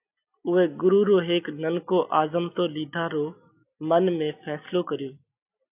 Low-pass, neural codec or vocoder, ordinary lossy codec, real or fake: 3.6 kHz; none; AAC, 32 kbps; real